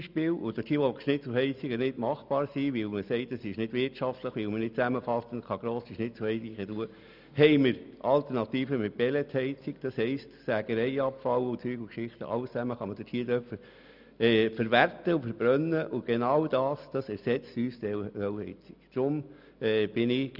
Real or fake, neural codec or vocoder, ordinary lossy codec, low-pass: real; none; none; 5.4 kHz